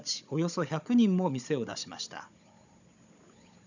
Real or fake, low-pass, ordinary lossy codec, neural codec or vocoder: fake; 7.2 kHz; none; codec, 16 kHz, 16 kbps, FunCodec, trained on Chinese and English, 50 frames a second